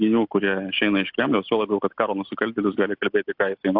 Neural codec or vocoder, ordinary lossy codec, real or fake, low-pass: none; AAC, 48 kbps; real; 5.4 kHz